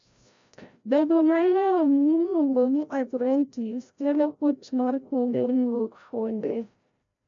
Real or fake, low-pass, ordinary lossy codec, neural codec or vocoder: fake; 7.2 kHz; MP3, 64 kbps; codec, 16 kHz, 0.5 kbps, FreqCodec, larger model